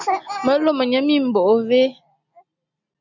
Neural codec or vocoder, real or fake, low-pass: none; real; 7.2 kHz